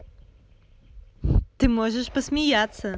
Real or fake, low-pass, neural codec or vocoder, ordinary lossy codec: real; none; none; none